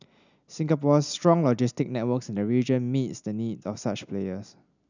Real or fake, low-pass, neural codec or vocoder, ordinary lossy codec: real; 7.2 kHz; none; none